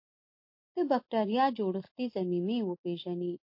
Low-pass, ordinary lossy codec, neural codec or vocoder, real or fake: 5.4 kHz; MP3, 32 kbps; none; real